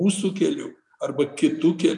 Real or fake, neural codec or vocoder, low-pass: real; none; 10.8 kHz